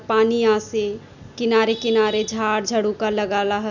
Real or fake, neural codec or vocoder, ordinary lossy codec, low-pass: real; none; none; 7.2 kHz